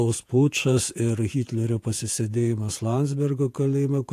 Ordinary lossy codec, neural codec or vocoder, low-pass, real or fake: AAC, 64 kbps; vocoder, 48 kHz, 128 mel bands, Vocos; 14.4 kHz; fake